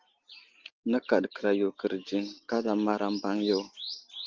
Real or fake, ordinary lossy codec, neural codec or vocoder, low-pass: real; Opus, 16 kbps; none; 7.2 kHz